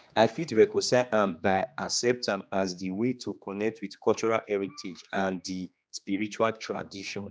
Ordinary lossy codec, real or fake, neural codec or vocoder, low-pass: none; fake; codec, 16 kHz, 2 kbps, X-Codec, HuBERT features, trained on general audio; none